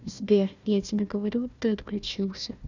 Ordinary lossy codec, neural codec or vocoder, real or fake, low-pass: none; codec, 16 kHz, 1 kbps, FunCodec, trained on Chinese and English, 50 frames a second; fake; 7.2 kHz